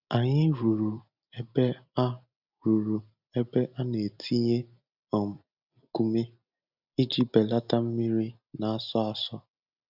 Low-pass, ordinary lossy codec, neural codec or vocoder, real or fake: 5.4 kHz; none; none; real